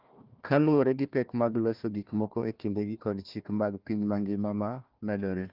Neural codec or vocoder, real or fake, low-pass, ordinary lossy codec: codec, 16 kHz, 1 kbps, FunCodec, trained on Chinese and English, 50 frames a second; fake; 5.4 kHz; Opus, 32 kbps